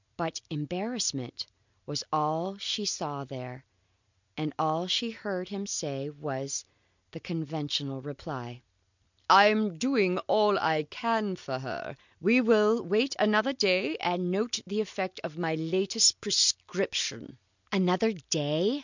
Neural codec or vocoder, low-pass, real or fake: none; 7.2 kHz; real